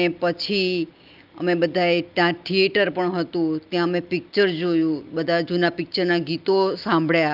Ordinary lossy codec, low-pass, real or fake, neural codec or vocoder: Opus, 32 kbps; 5.4 kHz; real; none